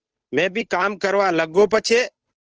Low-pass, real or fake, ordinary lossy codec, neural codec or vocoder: 7.2 kHz; fake; Opus, 16 kbps; codec, 16 kHz, 8 kbps, FunCodec, trained on Chinese and English, 25 frames a second